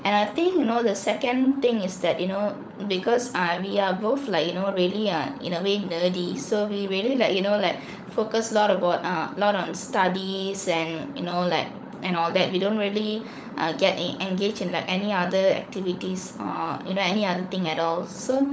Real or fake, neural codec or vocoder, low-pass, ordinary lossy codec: fake; codec, 16 kHz, 16 kbps, FunCodec, trained on LibriTTS, 50 frames a second; none; none